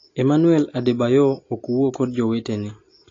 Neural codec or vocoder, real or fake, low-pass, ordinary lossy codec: none; real; 7.2 kHz; AAC, 32 kbps